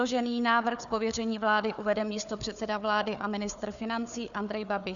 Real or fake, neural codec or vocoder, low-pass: fake; codec, 16 kHz, 4 kbps, FunCodec, trained on Chinese and English, 50 frames a second; 7.2 kHz